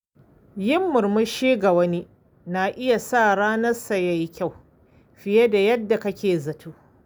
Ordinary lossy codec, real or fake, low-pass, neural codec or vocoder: none; real; none; none